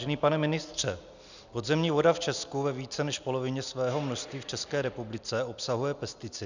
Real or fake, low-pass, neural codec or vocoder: real; 7.2 kHz; none